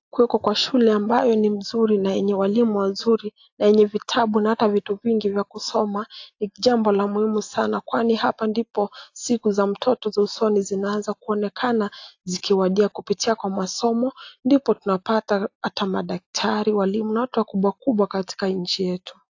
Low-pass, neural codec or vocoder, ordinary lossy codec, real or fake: 7.2 kHz; none; AAC, 48 kbps; real